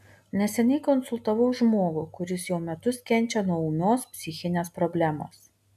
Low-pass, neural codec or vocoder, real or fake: 14.4 kHz; none; real